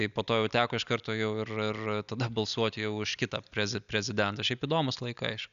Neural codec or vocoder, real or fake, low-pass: none; real; 7.2 kHz